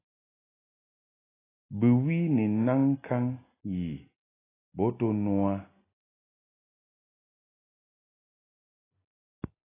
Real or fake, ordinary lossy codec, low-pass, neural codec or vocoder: real; AAC, 16 kbps; 3.6 kHz; none